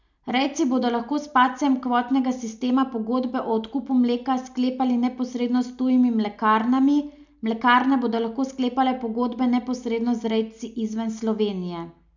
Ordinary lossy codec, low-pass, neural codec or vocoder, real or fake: none; 7.2 kHz; none; real